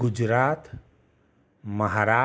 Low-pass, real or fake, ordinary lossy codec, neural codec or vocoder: none; real; none; none